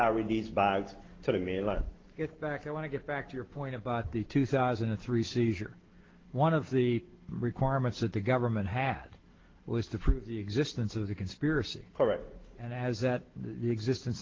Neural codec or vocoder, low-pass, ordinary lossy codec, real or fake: none; 7.2 kHz; Opus, 16 kbps; real